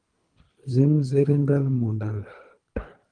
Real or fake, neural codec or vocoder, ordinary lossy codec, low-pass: fake; codec, 24 kHz, 3 kbps, HILCodec; Opus, 32 kbps; 9.9 kHz